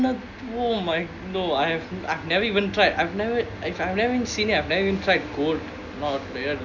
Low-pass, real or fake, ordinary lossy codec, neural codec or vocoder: 7.2 kHz; real; none; none